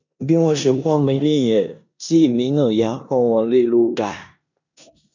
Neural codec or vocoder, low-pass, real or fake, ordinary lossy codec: codec, 16 kHz in and 24 kHz out, 0.9 kbps, LongCat-Audio-Codec, four codebook decoder; 7.2 kHz; fake; none